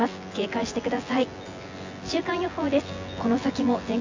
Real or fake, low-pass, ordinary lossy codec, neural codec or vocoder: fake; 7.2 kHz; AAC, 48 kbps; vocoder, 24 kHz, 100 mel bands, Vocos